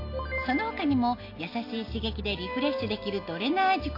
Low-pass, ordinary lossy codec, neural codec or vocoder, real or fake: 5.4 kHz; AAC, 32 kbps; none; real